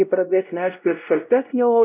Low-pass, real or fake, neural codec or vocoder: 3.6 kHz; fake; codec, 16 kHz, 0.5 kbps, X-Codec, WavLM features, trained on Multilingual LibriSpeech